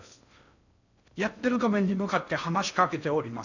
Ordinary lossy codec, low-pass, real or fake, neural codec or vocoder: none; 7.2 kHz; fake; codec, 16 kHz in and 24 kHz out, 0.6 kbps, FocalCodec, streaming, 2048 codes